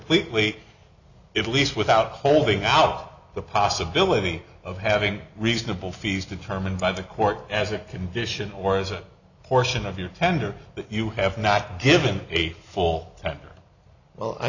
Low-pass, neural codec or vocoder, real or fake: 7.2 kHz; none; real